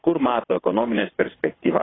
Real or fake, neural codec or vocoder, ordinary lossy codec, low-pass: fake; vocoder, 22.05 kHz, 80 mel bands, WaveNeXt; AAC, 16 kbps; 7.2 kHz